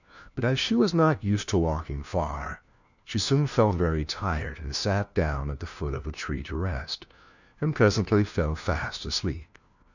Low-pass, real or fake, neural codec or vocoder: 7.2 kHz; fake; codec, 16 kHz, 1 kbps, FunCodec, trained on LibriTTS, 50 frames a second